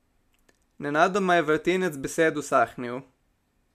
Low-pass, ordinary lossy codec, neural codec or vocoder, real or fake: 14.4 kHz; MP3, 96 kbps; none; real